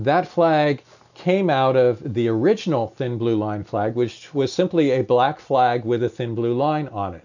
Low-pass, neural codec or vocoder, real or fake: 7.2 kHz; none; real